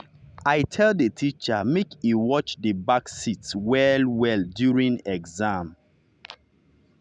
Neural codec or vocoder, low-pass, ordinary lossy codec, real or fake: none; 9.9 kHz; none; real